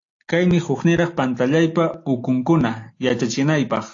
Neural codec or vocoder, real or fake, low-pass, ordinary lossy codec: none; real; 7.2 kHz; AAC, 48 kbps